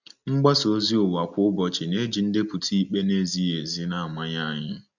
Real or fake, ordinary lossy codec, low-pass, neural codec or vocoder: real; none; 7.2 kHz; none